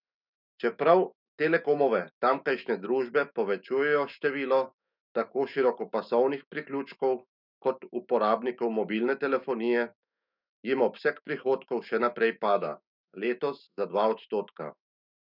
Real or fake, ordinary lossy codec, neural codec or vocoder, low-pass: fake; none; autoencoder, 48 kHz, 128 numbers a frame, DAC-VAE, trained on Japanese speech; 5.4 kHz